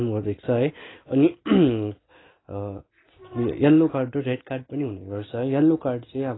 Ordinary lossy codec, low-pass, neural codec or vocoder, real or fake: AAC, 16 kbps; 7.2 kHz; none; real